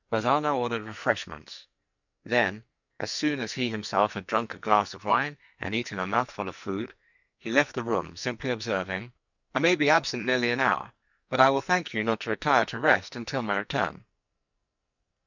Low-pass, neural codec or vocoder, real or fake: 7.2 kHz; codec, 44.1 kHz, 2.6 kbps, SNAC; fake